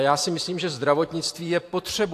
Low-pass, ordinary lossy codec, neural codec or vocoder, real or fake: 14.4 kHz; AAC, 64 kbps; vocoder, 44.1 kHz, 128 mel bands every 512 samples, BigVGAN v2; fake